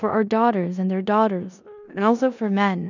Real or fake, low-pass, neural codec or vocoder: fake; 7.2 kHz; codec, 16 kHz in and 24 kHz out, 0.9 kbps, LongCat-Audio-Codec, four codebook decoder